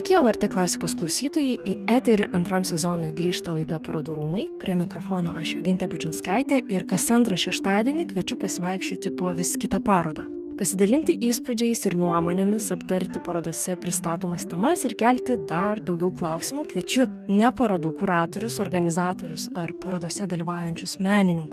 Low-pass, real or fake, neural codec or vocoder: 14.4 kHz; fake; codec, 44.1 kHz, 2.6 kbps, DAC